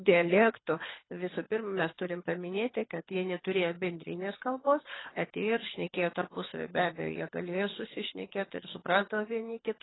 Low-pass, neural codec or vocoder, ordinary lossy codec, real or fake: 7.2 kHz; codec, 24 kHz, 6 kbps, HILCodec; AAC, 16 kbps; fake